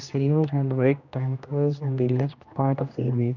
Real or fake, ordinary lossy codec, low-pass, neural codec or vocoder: fake; none; 7.2 kHz; codec, 16 kHz, 1 kbps, X-Codec, HuBERT features, trained on general audio